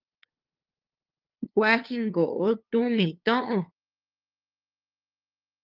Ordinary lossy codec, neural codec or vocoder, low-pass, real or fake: Opus, 32 kbps; codec, 16 kHz, 2 kbps, FunCodec, trained on LibriTTS, 25 frames a second; 5.4 kHz; fake